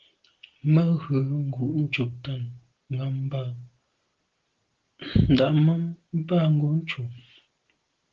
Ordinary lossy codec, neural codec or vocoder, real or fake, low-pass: Opus, 16 kbps; none; real; 7.2 kHz